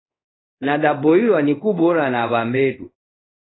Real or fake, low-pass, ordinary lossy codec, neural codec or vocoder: fake; 7.2 kHz; AAC, 16 kbps; codec, 24 kHz, 0.5 kbps, DualCodec